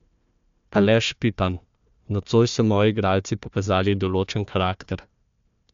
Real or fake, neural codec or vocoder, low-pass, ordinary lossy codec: fake; codec, 16 kHz, 1 kbps, FunCodec, trained on Chinese and English, 50 frames a second; 7.2 kHz; MP3, 64 kbps